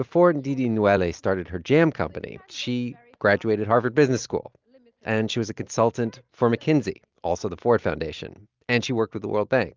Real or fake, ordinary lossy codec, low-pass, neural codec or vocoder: real; Opus, 24 kbps; 7.2 kHz; none